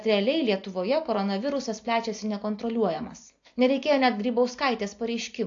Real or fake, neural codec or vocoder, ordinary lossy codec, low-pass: real; none; AAC, 48 kbps; 7.2 kHz